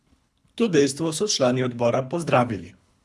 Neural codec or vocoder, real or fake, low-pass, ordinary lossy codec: codec, 24 kHz, 3 kbps, HILCodec; fake; none; none